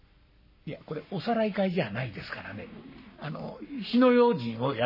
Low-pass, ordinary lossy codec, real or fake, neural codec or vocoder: 5.4 kHz; MP3, 24 kbps; fake; codec, 44.1 kHz, 7.8 kbps, Pupu-Codec